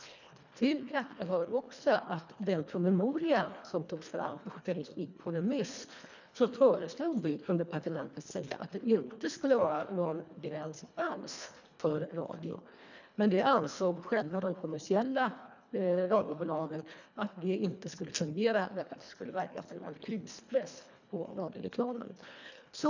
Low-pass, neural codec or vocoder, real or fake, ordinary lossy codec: 7.2 kHz; codec, 24 kHz, 1.5 kbps, HILCodec; fake; none